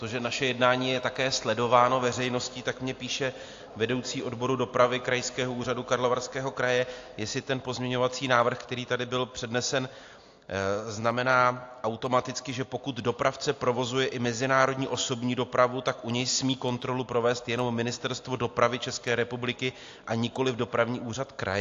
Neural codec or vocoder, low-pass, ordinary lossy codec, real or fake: none; 7.2 kHz; AAC, 48 kbps; real